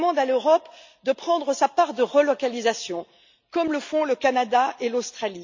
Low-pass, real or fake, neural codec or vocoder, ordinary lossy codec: 7.2 kHz; real; none; MP3, 64 kbps